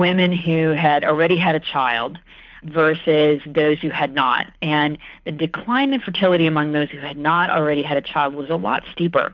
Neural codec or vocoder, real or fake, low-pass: codec, 24 kHz, 6 kbps, HILCodec; fake; 7.2 kHz